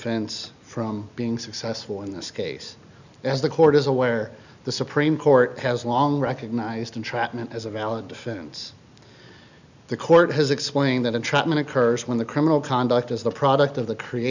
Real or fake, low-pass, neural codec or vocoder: real; 7.2 kHz; none